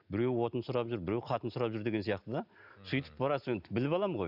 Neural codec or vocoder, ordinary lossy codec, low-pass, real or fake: none; none; 5.4 kHz; real